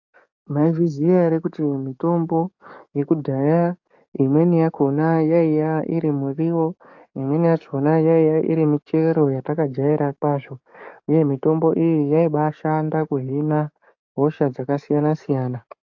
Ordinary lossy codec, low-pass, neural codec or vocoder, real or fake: AAC, 48 kbps; 7.2 kHz; codec, 44.1 kHz, 7.8 kbps, DAC; fake